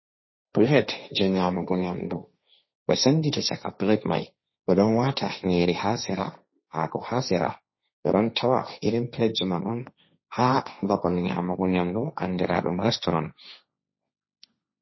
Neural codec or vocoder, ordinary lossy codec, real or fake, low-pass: codec, 16 kHz, 1.1 kbps, Voila-Tokenizer; MP3, 24 kbps; fake; 7.2 kHz